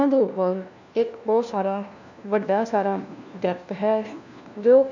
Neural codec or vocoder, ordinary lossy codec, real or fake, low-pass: codec, 16 kHz, 1 kbps, FunCodec, trained on LibriTTS, 50 frames a second; none; fake; 7.2 kHz